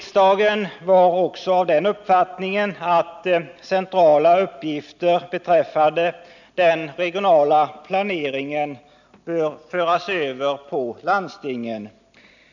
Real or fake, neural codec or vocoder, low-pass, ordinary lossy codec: real; none; 7.2 kHz; none